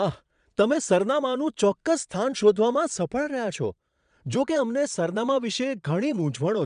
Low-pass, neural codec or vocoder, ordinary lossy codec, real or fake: 9.9 kHz; vocoder, 22.05 kHz, 80 mel bands, Vocos; none; fake